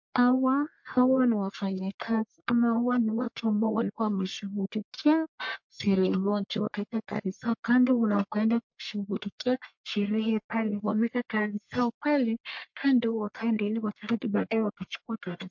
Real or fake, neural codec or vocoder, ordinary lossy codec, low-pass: fake; codec, 44.1 kHz, 1.7 kbps, Pupu-Codec; MP3, 48 kbps; 7.2 kHz